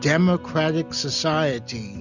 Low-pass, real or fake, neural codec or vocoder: 7.2 kHz; real; none